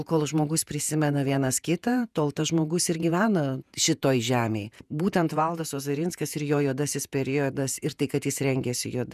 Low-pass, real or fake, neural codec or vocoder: 14.4 kHz; fake; vocoder, 48 kHz, 128 mel bands, Vocos